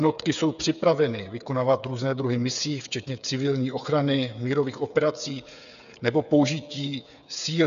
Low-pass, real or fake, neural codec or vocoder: 7.2 kHz; fake; codec, 16 kHz, 8 kbps, FreqCodec, smaller model